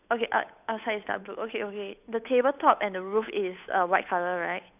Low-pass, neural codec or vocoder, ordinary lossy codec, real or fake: 3.6 kHz; codec, 16 kHz, 8 kbps, FunCodec, trained on Chinese and English, 25 frames a second; none; fake